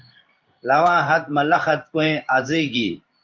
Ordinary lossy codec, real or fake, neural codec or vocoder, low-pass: Opus, 24 kbps; fake; codec, 16 kHz in and 24 kHz out, 1 kbps, XY-Tokenizer; 7.2 kHz